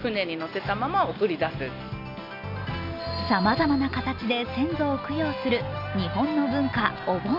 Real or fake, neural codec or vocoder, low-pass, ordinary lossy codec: real; none; 5.4 kHz; none